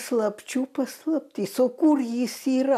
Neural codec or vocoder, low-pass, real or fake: none; 14.4 kHz; real